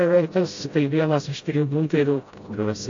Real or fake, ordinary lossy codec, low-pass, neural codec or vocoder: fake; AAC, 48 kbps; 7.2 kHz; codec, 16 kHz, 0.5 kbps, FreqCodec, smaller model